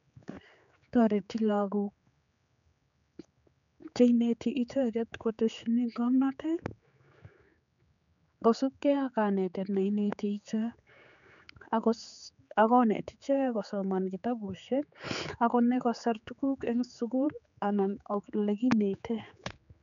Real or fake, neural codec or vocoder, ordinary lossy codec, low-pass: fake; codec, 16 kHz, 4 kbps, X-Codec, HuBERT features, trained on general audio; none; 7.2 kHz